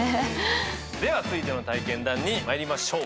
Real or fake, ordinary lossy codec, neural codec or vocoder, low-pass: real; none; none; none